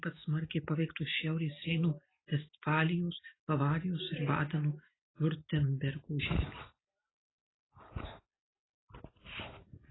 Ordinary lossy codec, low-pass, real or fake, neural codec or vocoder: AAC, 16 kbps; 7.2 kHz; real; none